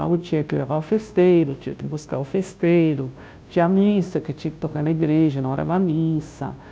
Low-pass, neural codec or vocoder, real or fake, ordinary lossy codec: none; codec, 16 kHz, 0.5 kbps, FunCodec, trained on Chinese and English, 25 frames a second; fake; none